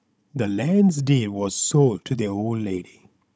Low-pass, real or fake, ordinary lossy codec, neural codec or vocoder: none; fake; none; codec, 16 kHz, 16 kbps, FunCodec, trained on Chinese and English, 50 frames a second